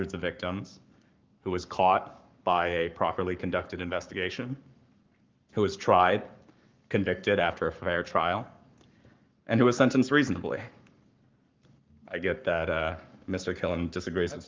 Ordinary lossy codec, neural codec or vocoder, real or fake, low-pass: Opus, 32 kbps; codec, 44.1 kHz, 7.8 kbps, Pupu-Codec; fake; 7.2 kHz